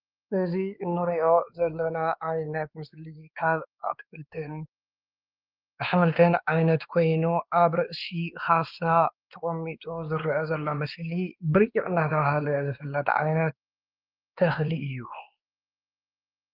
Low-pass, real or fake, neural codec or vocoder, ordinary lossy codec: 5.4 kHz; fake; codec, 16 kHz, 2 kbps, X-Codec, WavLM features, trained on Multilingual LibriSpeech; Opus, 32 kbps